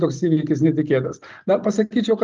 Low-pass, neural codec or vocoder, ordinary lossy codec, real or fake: 7.2 kHz; none; Opus, 32 kbps; real